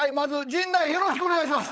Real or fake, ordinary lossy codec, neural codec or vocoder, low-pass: fake; none; codec, 16 kHz, 16 kbps, FunCodec, trained on LibriTTS, 50 frames a second; none